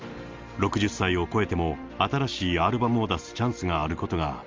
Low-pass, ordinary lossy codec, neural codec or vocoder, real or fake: 7.2 kHz; Opus, 32 kbps; none; real